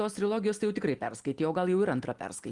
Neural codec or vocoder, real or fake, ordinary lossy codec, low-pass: none; real; Opus, 24 kbps; 10.8 kHz